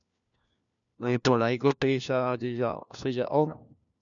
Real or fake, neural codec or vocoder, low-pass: fake; codec, 16 kHz, 1 kbps, FunCodec, trained on LibriTTS, 50 frames a second; 7.2 kHz